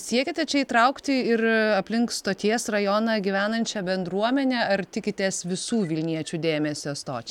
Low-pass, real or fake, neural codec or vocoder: 19.8 kHz; real; none